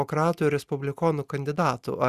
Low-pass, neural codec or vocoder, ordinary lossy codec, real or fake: 14.4 kHz; none; Opus, 64 kbps; real